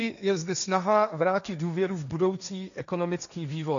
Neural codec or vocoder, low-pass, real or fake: codec, 16 kHz, 1.1 kbps, Voila-Tokenizer; 7.2 kHz; fake